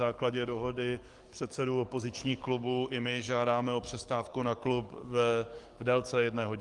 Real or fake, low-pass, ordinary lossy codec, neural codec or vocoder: fake; 10.8 kHz; Opus, 24 kbps; codec, 44.1 kHz, 7.8 kbps, DAC